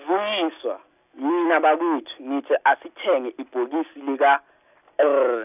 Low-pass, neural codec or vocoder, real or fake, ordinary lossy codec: 3.6 kHz; none; real; none